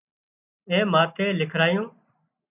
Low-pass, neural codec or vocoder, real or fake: 3.6 kHz; none; real